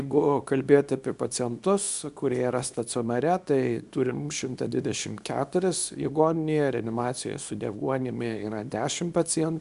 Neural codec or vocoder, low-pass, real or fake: codec, 24 kHz, 0.9 kbps, WavTokenizer, small release; 10.8 kHz; fake